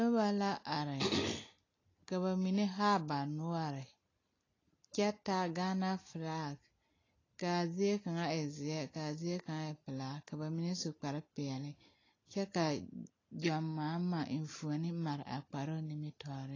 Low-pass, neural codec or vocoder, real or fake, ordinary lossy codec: 7.2 kHz; none; real; AAC, 32 kbps